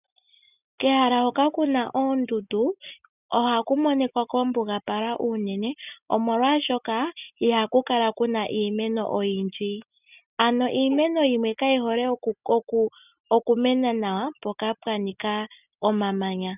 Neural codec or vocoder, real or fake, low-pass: none; real; 3.6 kHz